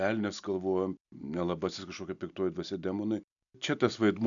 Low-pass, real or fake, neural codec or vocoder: 7.2 kHz; real; none